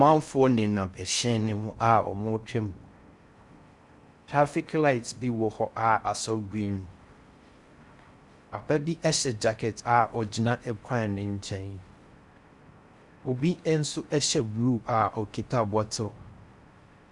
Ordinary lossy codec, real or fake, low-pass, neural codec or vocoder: Opus, 64 kbps; fake; 10.8 kHz; codec, 16 kHz in and 24 kHz out, 0.6 kbps, FocalCodec, streaming, 4096 codes